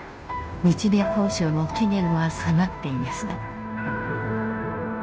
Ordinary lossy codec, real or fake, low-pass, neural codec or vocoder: none; fake; none; codec, 16 kHz, 0.5 kbps, FunCodec, trained on Chinese and English, 25 frames a second